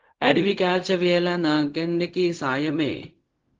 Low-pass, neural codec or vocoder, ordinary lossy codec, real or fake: 7.2 kHz; codec, 16 kHz, 0.4 kbps, LongCat-Audio-Codec; Opus, 16 kbps; fake